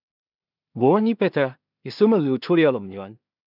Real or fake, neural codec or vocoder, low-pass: fake; codec, 16 kHz in and 24 kHz out, 0.4 kbps, LongCat-Audio-Codec, two codebook decoder; 5.4 kHz